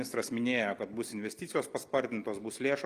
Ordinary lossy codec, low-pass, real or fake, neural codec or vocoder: Opus, 32 kbps; 14.4 kHz; real; none